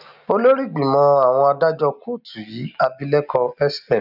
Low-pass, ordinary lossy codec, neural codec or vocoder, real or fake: 5.4 kHz; none; none; real